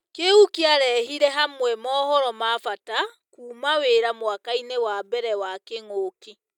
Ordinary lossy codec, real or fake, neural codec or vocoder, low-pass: none; real; none; 19.8 kHz